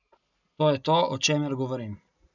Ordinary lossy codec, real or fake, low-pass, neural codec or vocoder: none; real; 7.2 kHz; none